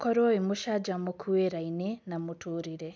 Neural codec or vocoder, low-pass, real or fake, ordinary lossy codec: none; none; real; none